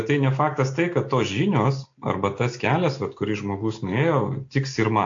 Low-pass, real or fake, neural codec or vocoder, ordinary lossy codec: 7.2 kHz; real; none; AAC, 48 kbps